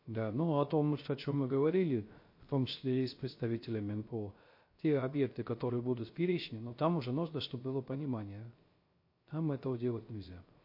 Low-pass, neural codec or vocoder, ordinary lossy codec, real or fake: 5.4 kHz; codec, 16 kHz, 0.3 kbps, FocalCodec; MP3, 32 kbps; fake